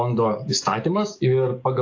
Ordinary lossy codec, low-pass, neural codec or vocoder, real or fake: AAC, 48 kbps; 7.2 kHz; codec, 44.1 kHz, 7.8 kbps, DAC; fake